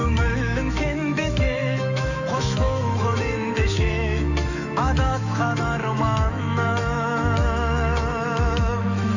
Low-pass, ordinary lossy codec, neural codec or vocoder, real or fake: 7.2 kHz; none; autoencoder, 48 kHz, 128 numbers a frame, DAC-VAE, trained on Japanese speech; fake